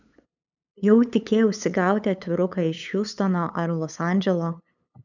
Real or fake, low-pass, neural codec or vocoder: fake; 7.2 kHz; codec, 16 kHz, 8 kbps, FunCodec, trained on LibriTTS, 25 frames a second